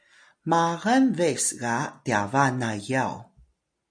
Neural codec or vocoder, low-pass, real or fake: none; 9.9 kHz; real